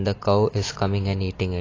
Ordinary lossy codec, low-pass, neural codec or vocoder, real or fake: AAC, 32 kbps; 7.2 kHz; none; real